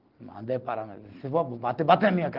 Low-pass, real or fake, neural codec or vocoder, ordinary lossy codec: 5.4 kHz; real; none; Opus, 16 kbps